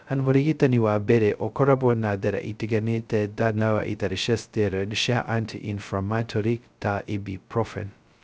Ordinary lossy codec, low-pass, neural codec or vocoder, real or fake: none; none; codec, 16 kHz, 0.2 kbps, FocalCodec; fake